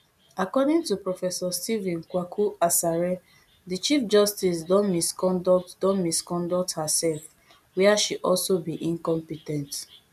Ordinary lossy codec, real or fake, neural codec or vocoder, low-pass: none; real; none; 14.4 kHz